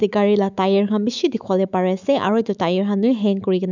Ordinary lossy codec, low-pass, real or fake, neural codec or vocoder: none; 7.2 kHz; fake; autoencoder, 48 kHz, 128 numbers a frame, DAC-VAE, trained on Japanese speech